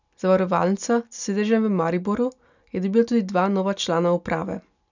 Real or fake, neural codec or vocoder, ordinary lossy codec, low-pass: real; none; none; 7.2 kHz